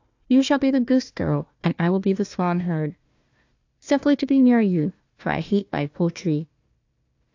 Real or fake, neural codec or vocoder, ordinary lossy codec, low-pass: fake; codec, 16 kHz, 1 kbps, FunCodec, trained on Chinese and English, 50 frames a second; AAC, 48 kbps; 7.2 kHz